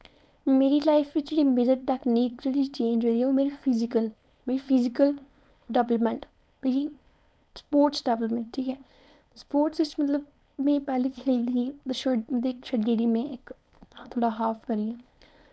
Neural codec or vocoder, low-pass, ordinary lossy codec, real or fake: codec, 16 kHz, 4.8 kbps, FACodec; none; none; fake